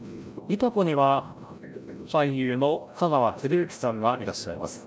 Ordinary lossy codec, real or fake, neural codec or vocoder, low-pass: none; fake; codec, 16 kHz, 0.5 kbps, FreqCodec, larger model; none